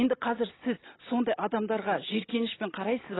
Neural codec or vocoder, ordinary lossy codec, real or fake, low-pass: none; AAC, 16 kbps; real; 7.2 kHz